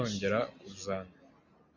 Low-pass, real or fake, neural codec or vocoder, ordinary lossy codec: 7.2 kHz; real; none; MP3, 48 kbps